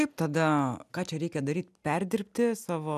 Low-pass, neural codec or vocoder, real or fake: 14.4 kHz; none; real